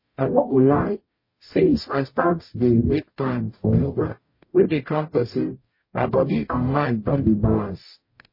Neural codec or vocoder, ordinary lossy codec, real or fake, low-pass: codec, 44.1 kHz, 0.9 kbps, DAC; MP3, 24 kbps; fake; 5.4 kHz